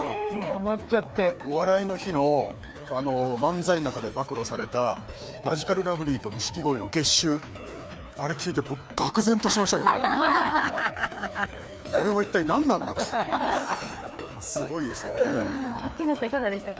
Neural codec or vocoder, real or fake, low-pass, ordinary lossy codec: codec, 16 kHz, 2 kbps, FreqCodec, larger model; fake; none; none